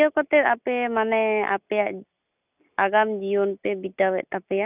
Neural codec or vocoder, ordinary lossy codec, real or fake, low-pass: none; none; real; 3.6 kHz